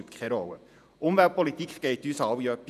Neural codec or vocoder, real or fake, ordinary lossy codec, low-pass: vocoder, 48 kHz, 128 mel bands, Vocos; fake; none; 14.4 kHz